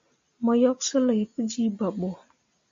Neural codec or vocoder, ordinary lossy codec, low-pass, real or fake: none; MP3, 48 kbps; 7.2 kHz; real